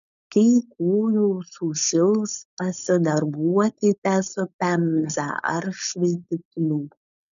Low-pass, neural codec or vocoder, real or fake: 7.2 kHz; codec, 16 kHz, 4.8 kbps, FACodec; fake